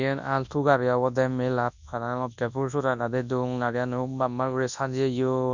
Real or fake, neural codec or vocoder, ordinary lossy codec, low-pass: fake; codec, 24 kHz, 0.9 kbps, WavTokenizer, large speech release; none; 7.2 kHz